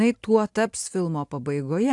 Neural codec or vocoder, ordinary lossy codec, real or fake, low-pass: none; AAC, 64 kbps; real; 10.8 kHz